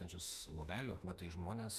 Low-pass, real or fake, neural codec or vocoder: 14.4 kHz; fake; autoencoder, 48 kHz, 32 numbers a frame, DAC-VAE, trained on Japanese speech